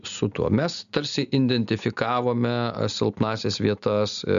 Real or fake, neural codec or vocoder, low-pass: real; none; 7.2 kHz